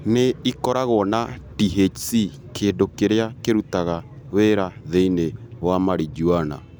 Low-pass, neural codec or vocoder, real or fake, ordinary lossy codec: none; none; real; none